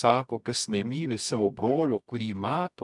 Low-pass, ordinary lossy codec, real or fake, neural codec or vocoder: 10.8 kHz; MP3, 96 kbps; fake; codec, 24 kHz, 0.9 kbps, WavTokenizer, medium music audio release